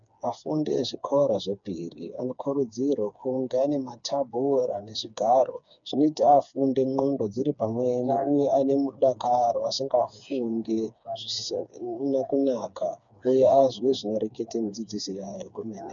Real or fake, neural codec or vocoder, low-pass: fake; codec, 16 kHz, 4 kbps, FreqCodec, smaller model; 7.2 kHz